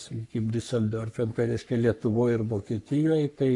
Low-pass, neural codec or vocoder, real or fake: 10.8 kHz; codec, 44.1 kHz, 3.4 kbps, Pupu-Codec; fake